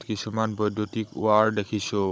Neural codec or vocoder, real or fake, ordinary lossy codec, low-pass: codec, 16 kHz, 16 kbps, FunCodec, trained on Chinese and English, 50 frames a second; fake; none; none